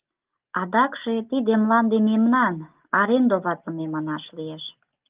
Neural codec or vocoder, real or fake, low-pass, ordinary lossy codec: none; real; 3.6 kHz; Opus, 32 kbps